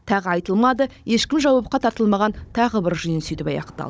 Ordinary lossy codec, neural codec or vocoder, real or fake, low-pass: none; codec, 16 kHz, 16 kbps, FunCodec, trained on Chinese and English, 50 frames a second; fake; none